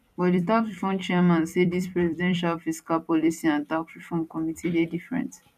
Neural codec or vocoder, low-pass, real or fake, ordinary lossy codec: vocoder, 44.1 kHz, 128 mel bands every 256 samples, BigVGAN v2; 14.4 kHz; fake; none